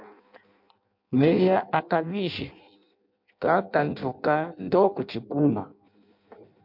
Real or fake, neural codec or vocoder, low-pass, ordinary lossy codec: fake; codec, 16 kHz in and 24 kHz out, 0.6 kbps, FireRedTTS-2 codec; 5.4 kHz; MP3, 48 kbps